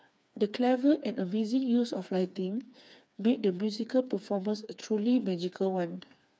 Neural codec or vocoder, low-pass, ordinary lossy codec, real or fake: codec, 16 kHz, 4 kbps, FreqCodec, smaller model; none; none; fake